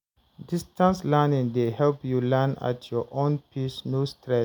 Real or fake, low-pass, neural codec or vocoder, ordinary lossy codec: real; 19.8 kHz; none; none